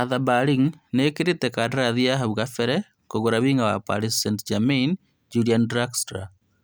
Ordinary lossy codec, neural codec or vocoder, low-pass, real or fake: none; none; none; real